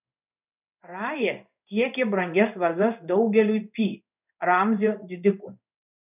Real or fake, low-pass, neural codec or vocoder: fake; 3.6 kHz; codec, 16 kHz in and 24 kHz out, 1 kbps, XY-Tokenizer